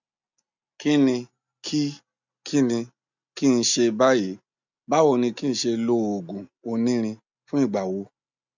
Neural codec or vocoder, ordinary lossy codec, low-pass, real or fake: none; none; 7.2 kHz; real